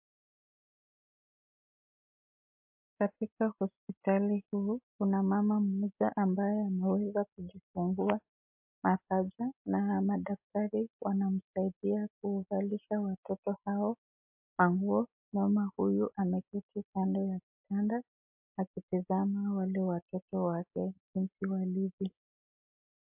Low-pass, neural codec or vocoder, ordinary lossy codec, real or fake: 3.6 kHz; none; MP3, 32 kbps; real